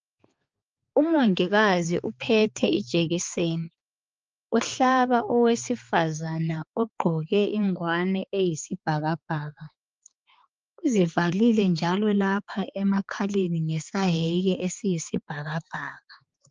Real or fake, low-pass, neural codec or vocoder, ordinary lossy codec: fake; 7.2 kHz; codec, 16 kHz, 4 kbps, X-Codec, HuBERT features, trained on balanced general audio; Opus, 24 kbps